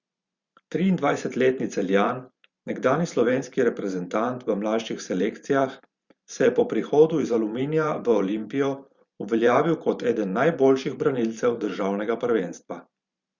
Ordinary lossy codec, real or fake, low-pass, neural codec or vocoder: Opus, 64 kbps; fake; 7.2 kHz; vocoder, 44.1 kHz, 128 mel bands every 512 samples, BigVGAN v2